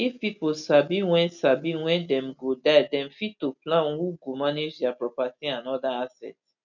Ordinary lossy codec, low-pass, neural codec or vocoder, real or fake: none; 7.2 kHz; none; real